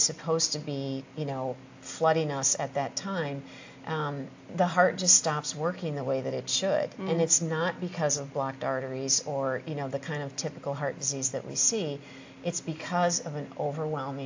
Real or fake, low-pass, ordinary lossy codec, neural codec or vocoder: real; 7.2 kHz; AAC, 48 kbps; none